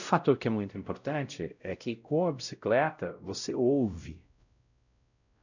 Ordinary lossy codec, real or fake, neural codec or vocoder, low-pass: none; fake; codec, 16 kHz, 0.5 kbps, X-Codec, WavLM features, trained on Multilingual LibriSpeech; 7.2 kHz